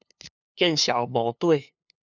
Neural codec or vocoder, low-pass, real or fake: codec, 16 kHz, 2 kbps, FunCodec, trained on LibriTTS, 25 frames a second; 7.2 kHz; fake